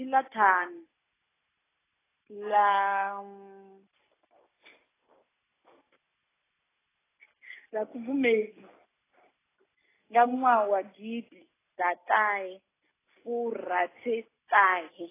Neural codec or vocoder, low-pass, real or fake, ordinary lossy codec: none; 3.6 kHz; real; AAC, 16 kbps